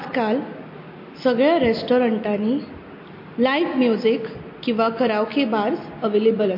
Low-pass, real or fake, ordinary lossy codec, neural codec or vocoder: 5.4 kHz; real; MP3, 32 kbps; none